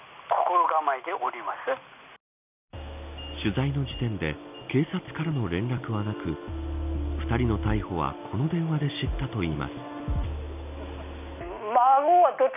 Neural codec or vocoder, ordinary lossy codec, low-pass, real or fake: none; none; 3.6 kHz; real